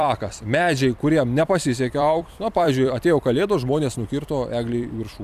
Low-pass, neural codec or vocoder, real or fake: 14.4 kHz; vocoder, 44.1 kHz, 128 mel bands every 256 samples, BigVGAN v2; fake